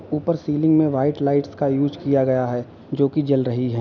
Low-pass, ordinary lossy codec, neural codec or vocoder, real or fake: 7.2 kHz; none; none; real